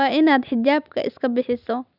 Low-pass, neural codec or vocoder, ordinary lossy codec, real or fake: 5.4 kHz; none; none; real